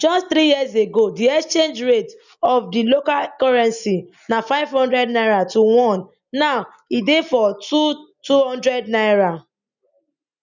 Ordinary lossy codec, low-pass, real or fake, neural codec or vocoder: none; 7.2 kHz; real; none